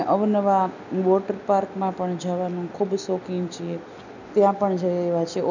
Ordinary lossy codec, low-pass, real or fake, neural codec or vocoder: none; 7.2 kHz; real; none